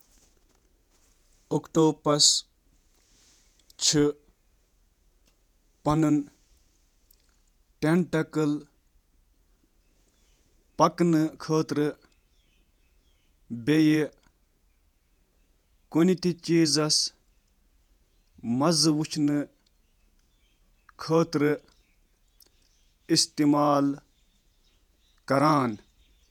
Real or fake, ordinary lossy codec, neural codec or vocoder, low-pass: fake; none; vocoder, 48 kHz, 128 mel bands, Vocos; 19.8 kHz